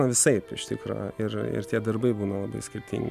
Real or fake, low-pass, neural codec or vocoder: real; 14.4 kHz; none